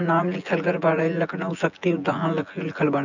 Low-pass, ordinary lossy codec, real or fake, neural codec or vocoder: 7.2 kHz; none; fake; vocoder, 24 kHz, 100 mel bands, Vocos